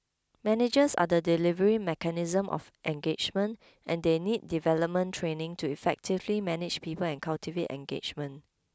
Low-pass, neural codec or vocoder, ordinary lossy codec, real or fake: none; none; none; real